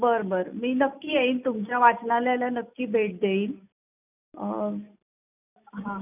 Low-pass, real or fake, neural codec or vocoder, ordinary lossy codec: 3.6 kHz; real; none; none